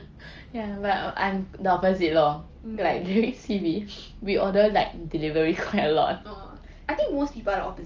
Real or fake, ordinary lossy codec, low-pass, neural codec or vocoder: real; Opus, 24 kbps; 7.2 kHz; none